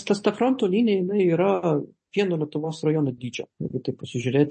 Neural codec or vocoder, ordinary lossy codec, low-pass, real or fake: none; MP3, 32 kbps; 10.8 kHz; real